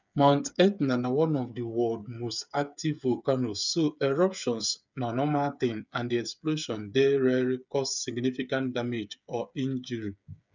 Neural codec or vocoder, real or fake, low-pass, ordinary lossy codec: codec, 16 kHz, 8 kbps, FreqCodec, smaller model; fake; 7.2 kHz; none